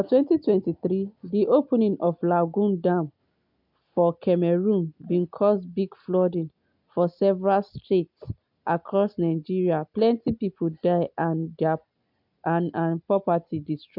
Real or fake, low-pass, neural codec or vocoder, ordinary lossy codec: real; 5.4 kHz; none; MP3, 48 kbps